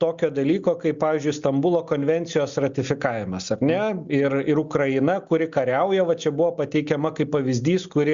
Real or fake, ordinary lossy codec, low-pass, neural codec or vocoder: real; Opus, 64 kbps; 7.2 kHz; none